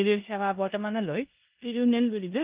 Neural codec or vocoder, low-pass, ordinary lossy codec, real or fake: codec, 16 kHz in and 24 kHz out, 0.9 kbps, LongCat-Audio-Codec, four codebook decoder; 3.6 kHz; Opus, 32 kbps; fake